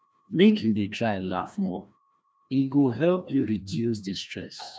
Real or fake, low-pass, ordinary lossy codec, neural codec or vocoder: fake; none; none; codec, 16 kHz, 1 kbps, FreqCodec, larger model